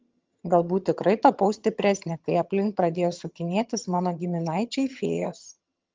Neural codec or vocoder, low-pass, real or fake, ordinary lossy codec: vocoder, 22.05 kHz, 80 mel bands, HiFi-GAN; 7.2 kHz; fake; Opus, 32 kbps